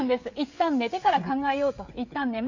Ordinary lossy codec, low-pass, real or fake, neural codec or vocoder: AAC, 48 kbps; 7.2 kHz; fake; codec, 16 kHz, 16 kbps, FreqCodec, smaller model